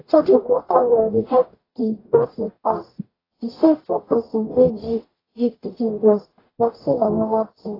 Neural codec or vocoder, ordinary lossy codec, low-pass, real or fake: codec, 44.1 kHz, 0.9 kbps, DAC; AAC, 24 kbps; 5.4 kHz; fake